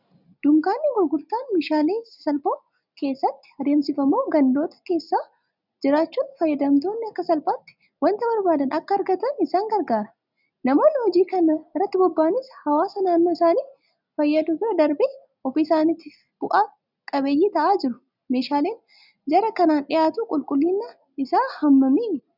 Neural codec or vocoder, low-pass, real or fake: none; 5.4 kHz; real